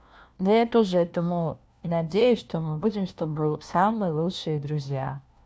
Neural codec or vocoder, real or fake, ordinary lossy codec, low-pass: codec, 16 kHz, 1 kbps, FunCodec, trained on LibriTTS, 50 frames a second; fake; none; none